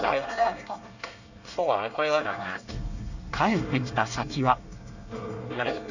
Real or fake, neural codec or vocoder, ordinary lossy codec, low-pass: fake; codec, 24 kHz, 1 kbps, SNAC; none; 7.2 kHz